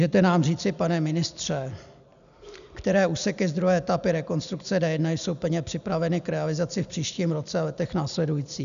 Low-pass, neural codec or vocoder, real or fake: 7.2 kHz; none; real